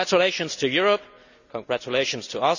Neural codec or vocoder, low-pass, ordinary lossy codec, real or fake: none; 7.2 kHz; none; real